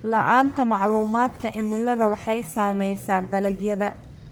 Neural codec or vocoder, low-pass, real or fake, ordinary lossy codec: codec, 44.1 kHz, 1.7 kbps, Pupu-Codec; none; fake; none